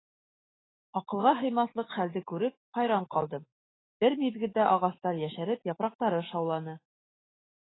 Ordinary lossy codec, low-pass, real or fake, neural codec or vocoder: AAC, 16 kbps; 7.2 kHz; fake; autoencoder, 48 kHz, 128 numbers a frame, DAC-VAE, trained on Japanese speech